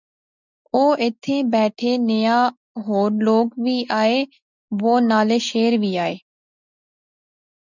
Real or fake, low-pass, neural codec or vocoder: real; 7.2 kHz; none